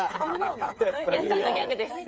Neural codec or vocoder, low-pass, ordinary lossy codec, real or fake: codec, 16 kHz, 8 kbps, FreqCodec, smaller model; none; none; fake